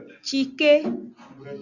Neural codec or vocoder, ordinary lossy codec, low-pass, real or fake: none; Opus, 64 kbps; 7.2 kHz; real